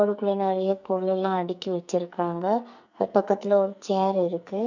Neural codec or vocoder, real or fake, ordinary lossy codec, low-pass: codec, 44.1 kHz, 2.6 kbps, SNAC; fake; none; 7.2 kHz